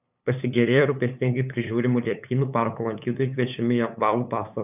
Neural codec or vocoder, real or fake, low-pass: codec, 16 kHz, 8 kbps, FunCodec, trained on LibriTTS, 25 frames a second; fake; 3.6 kHz